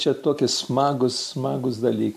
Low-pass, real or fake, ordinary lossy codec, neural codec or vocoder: 14.4 kHz; real; MP3, 64 kbps; none